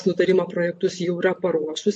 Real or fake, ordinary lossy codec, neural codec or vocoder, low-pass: real; MP3, 48 kbps; none; 9.9 kHz